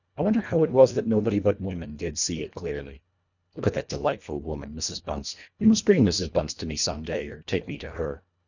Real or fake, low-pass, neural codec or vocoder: fake; 7.2 kHz; codec, 24 kHz, 1.5 kbps, HILCodec